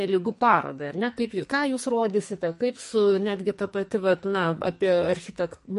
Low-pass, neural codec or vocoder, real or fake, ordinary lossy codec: 14.4 kHz; codec, 44.1 kHz, 2.6 kbps, SNAC; fake; MP3, 48 kbps